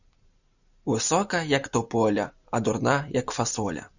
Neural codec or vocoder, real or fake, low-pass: none; real; 7.2 kHz